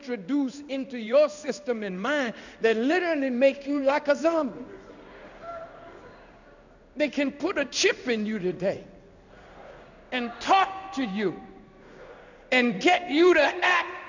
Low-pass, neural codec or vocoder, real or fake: 7.2 kHz; codec, 16 kHz in and 24 kHz out, 1 kbps, XY-Tokenizer; fake